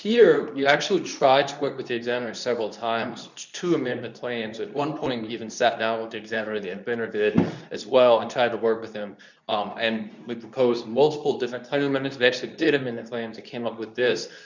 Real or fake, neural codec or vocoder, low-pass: fake; codec, 24 kHz, 0.9 kbps, WavTokenizer, medium speech release version 2; 7.2 kHz